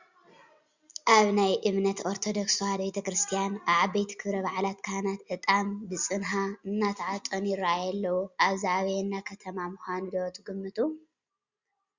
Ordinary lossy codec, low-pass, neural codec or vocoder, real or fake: Opus, 64 kbps; 7.2 kHz; none; real